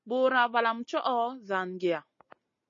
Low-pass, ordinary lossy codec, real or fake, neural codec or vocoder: 7.2 kHz; MP3, 32 kbps; real; none